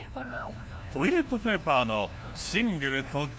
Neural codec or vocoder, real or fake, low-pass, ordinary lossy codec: codec, 16 kHz, 1 kbps, FunCodec, trained on LibriTTS, 50 frames a second; fake; none; none